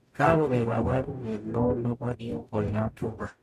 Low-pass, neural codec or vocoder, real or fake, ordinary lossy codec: 14.4 kHz; codec, 44.1 kHz, 0.9 kbps, DAC; fake; MP3, 64 kbps